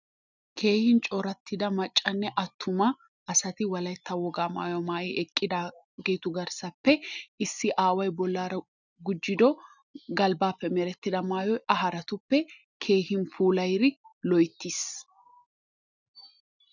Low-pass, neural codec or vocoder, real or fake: 7.2 kHz; none; real